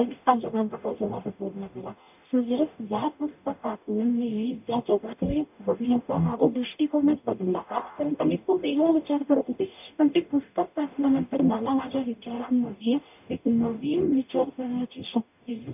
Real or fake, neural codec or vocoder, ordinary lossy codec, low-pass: fake; codec, 44.1 kHz, 0.9 kbps, DAC; none; 3.6 kHz